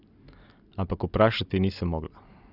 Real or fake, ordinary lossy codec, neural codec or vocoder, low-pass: real; none; none; 5.4 kHz